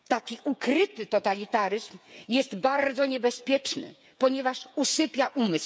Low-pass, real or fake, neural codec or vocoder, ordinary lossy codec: none; fake; codec, 16 kHz, 8 kbps, FreqCodec, smaller model; none